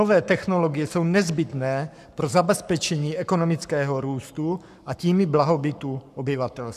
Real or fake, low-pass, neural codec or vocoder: fake; 14.4 kHz; codec, 44.1 kHz, 7.8 kbps, Pupu-Codec